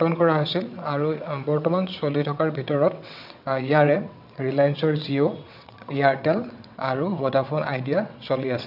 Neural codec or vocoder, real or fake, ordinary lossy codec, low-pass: vocoder, 22.05 kHz, 80 mel bands, WaveNeXt; fake; none; 5.4 kHz